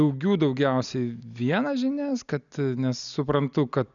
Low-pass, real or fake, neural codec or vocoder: 7.2 kHz; real; none